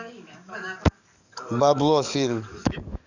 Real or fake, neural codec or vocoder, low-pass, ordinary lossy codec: fake; codec, 44.1 kHz, 7.8 kbps, DAC; 7.2 kHz; none